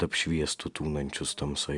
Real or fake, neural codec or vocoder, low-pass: real; none; 10.8 kHz